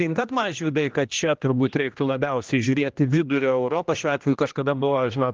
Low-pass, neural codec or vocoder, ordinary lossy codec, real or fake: 7.2 kHz; codec, 16 kHz, 1 kbps, X-Codec, HuBERT features, trained on general audio; Opus, 24 kbps; fake